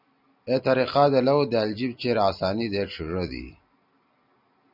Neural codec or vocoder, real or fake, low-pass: none; real; 5.4 kHz